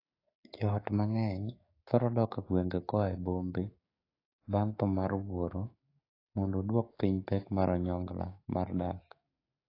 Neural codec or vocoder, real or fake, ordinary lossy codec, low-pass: codec, 16 kHz, 6 kbps, DAC; fake; AAC, 24 kbps; 5.4 kHz